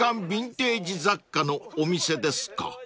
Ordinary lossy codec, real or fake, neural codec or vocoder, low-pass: none; real; none; none